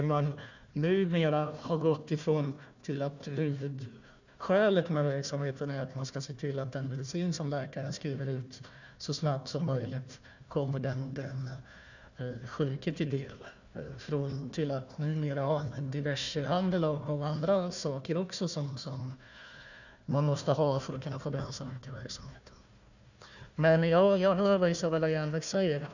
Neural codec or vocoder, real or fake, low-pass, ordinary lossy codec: codec, 16 kHz, 1 kbps, FunCodec, trained on Chinese and English, 50 frames a second; fake; 7.2 kHz; none